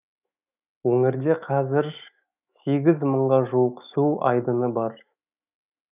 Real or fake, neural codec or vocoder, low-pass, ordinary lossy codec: fake; autoencoder, 48 kHz, 128 numbers a frame, DAC-VAE, trained on Japanese speech; 3.6 kHz; none